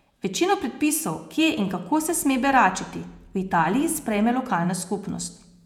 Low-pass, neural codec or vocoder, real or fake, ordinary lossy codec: 19.8 kHz; vocoder, 48 kHz, 128 mel bands, Vocos; fake; none